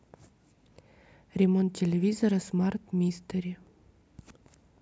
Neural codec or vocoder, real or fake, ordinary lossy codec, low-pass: none; real; none; none